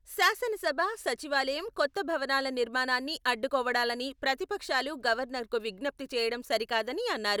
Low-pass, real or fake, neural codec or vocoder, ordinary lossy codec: none; real; none; none